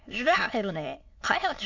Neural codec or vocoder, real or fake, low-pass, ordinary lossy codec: autoencoder, 22.05 kHz, a latent of 192 numbers a frame, VITS, trained on many speakers; fake; 7.2 kHz; MP3, 48 kbps